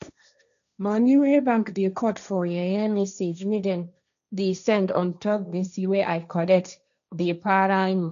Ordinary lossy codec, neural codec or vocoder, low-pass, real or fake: none; codec, 16 kHz, 1.1 kbps, Voila-Tokenizer; 7.2 kHz; fake